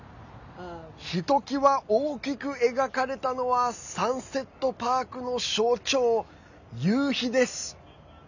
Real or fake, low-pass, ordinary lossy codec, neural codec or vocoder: real; 7.2 kHz; none; none